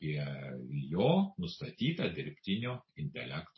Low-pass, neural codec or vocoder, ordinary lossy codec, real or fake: 7.2 kHz; none; MP3, 24 kbps; real